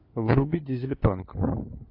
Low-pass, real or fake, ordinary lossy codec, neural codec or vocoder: 5.4 kHz; fake; AAC, 32 kbps; codec, 16 kHz, 4 kbps, FunCodec, trained on LibriTTS, 50 frames a second